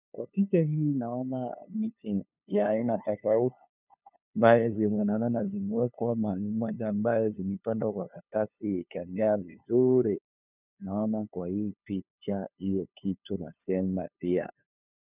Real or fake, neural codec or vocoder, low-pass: fake; codec, 16 kHz, 2 kbps, FunCodec, trained on LibriTTS, 25 frames a second; 3.6 kHz